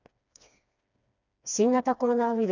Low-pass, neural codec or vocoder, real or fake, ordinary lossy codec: 7.2 kHz; codec, 16 kHz, 2 kbps, FreqCodec, smaller model; fake; none